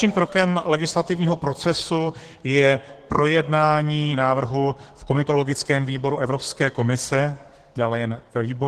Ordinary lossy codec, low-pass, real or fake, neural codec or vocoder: Opus, 16 kbps; 14.4 kHz; fake; codec, 32 kHz, 1.9 kbps, SNAC